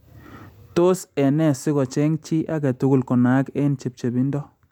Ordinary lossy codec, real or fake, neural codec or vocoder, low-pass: MP3, 96 kbps; real; none; 19.8 kHz